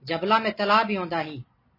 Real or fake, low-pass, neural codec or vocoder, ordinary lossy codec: real; 5.4 kHz; none; MP3, 24 kbps